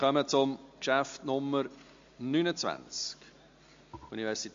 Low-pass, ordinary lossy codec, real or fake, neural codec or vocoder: 7.2 kHz; MP3, 48 kbps; real; none